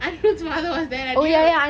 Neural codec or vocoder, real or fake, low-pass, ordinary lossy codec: none; real; none; none